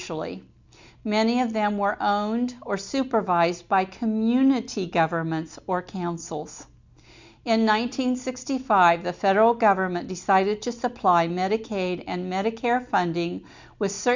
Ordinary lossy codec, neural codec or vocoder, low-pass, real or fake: MP3, 64 kbps; none; 7.2 kHz; real